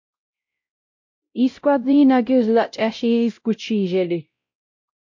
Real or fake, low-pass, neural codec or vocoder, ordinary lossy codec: fake; 7.2 kHz; codec, 16 kHz, 0.5 kbps, X-Codec, WavLM features, trained on Multilingual LibriSpeech; MP3, 48 kbps